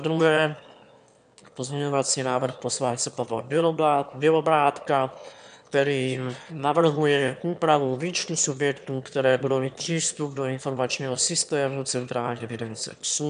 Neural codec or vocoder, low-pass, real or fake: autoencoder, 22.05 kHz, a latent of 192 numbers a frame, VITS, trained on one speaker; 9.9 kHz; fake